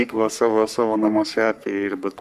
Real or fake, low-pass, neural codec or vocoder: fake; 14.4 kHz; codec, 44.1 kHz, 3.4 kbps, Pupu-Codec